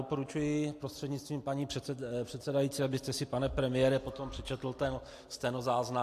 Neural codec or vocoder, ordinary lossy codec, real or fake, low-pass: none; AAC, 64 kbps; real; 14.4 kHz